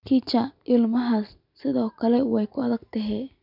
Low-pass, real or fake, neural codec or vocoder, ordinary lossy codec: 5.4 kHz; real; none; none